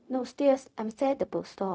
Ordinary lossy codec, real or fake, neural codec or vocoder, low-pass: none; fake; codec, 16 kHz, 0.4 kbps, LongCat-Audio-Codec; none